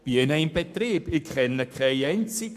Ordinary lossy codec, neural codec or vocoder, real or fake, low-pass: MP3, 96 kbps; codec, 44.1 kHz, 7.8 kbps, Pupu-Codec; fake; 14.4 kHz